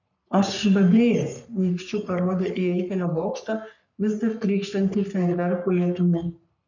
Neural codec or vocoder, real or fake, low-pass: codec, 44.1 kHz, 3.4 kbps, Pupu-Codec; fake; 7.2 kHz